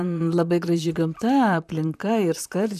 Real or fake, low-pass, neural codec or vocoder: fake; 14.4 kHz; vocoder, 44.1 kHz, 128 mel bands, Pupu-Vocoder